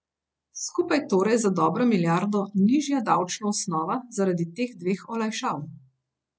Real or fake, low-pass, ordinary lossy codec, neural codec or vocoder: real; none; none; none